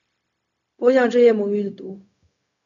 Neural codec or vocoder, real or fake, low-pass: codec, 16 kHz, 0.4 kbps, LongCat-Audio-Codec; fake; 7.2 kHz